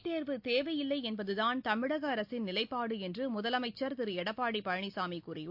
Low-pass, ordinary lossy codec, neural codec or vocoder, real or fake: 5.4 kHz; AAC, 48 kbps; none; real